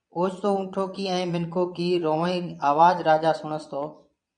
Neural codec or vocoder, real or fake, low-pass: vocoder, 22.05 kHz, 80 mel bands, Vocos; fake; 9.9 kHz